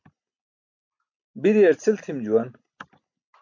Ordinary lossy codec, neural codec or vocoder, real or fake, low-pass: MP3, 64 kbps; none; real; 7.2 kHz